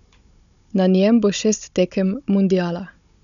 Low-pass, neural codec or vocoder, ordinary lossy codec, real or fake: 7.2 kHz; codec, 16 kHz, 16 kbps, FunCodec, trained on Chinese and English, 50 frames a second; none; fake